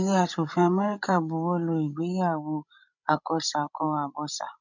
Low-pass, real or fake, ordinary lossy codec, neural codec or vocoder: 7.2 kHz; fake; none; codec, 16 kHz, 16 kbps, FreqCodec, larger model